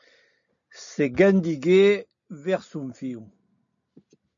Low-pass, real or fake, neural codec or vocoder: 7.2 kHz; real; none